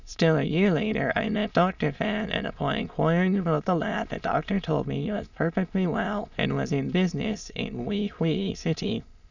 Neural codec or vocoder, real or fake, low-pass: autoencoder, 22.05 kHz, a latent of 192 numbers a frame, VITS, trained on many speakers; fake; 7.2 kHz